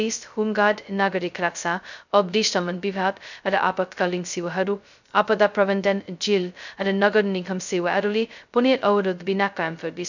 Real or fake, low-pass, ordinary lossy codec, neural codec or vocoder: fake; 7.2 kHz; none; codec, 16 kHz, 0.2 kbps, FocalCodec